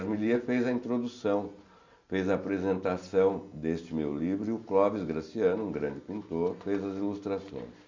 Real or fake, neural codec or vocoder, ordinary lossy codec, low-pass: real; none; MP3, 64 kbps; 7.2 kHz